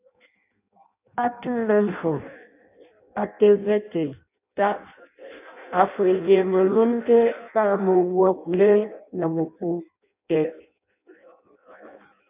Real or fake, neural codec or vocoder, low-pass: fake; codec, 16 kHz in and 24 kHz out, 0.6 kbps, FireRedTTS-2 codec; 3.6 kHz